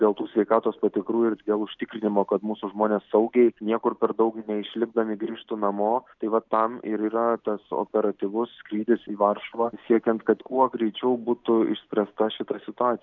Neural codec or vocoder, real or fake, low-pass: none; real; 7.2 kHz